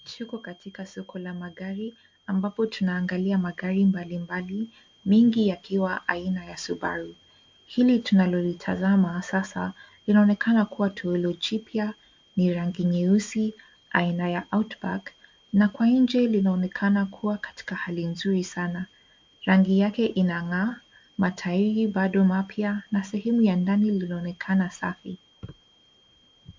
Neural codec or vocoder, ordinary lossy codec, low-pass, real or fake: none; MP3, 48 kbps; 7.2 kHz; real